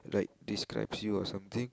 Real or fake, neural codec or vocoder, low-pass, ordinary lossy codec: real; none; none; none